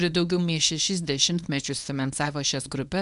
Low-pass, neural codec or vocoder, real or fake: 10.8 kHz; codec, 24 kHz, 0.9 kbps, WavTokenizer, medium speech release version 1; fake